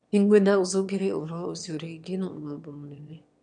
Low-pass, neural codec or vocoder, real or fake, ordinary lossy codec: 9.9 kHz; autoencoder, 22.05 kHz, a latent of 192 numbers a frame, VITS, trained on one speaker; fake; none